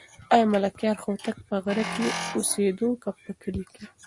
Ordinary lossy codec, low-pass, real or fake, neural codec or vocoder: Opus, 64 kbps; 10.8 kHz; real; none